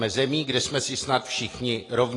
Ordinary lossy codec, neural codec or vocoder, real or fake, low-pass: AAC, 32 kbps; none; real; 10.8 kHz